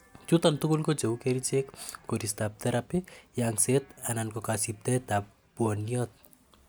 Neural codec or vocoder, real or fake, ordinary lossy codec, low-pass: none; real; none; none